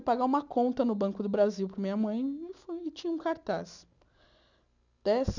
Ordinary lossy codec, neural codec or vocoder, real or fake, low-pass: none; none; real; 7.2 kHz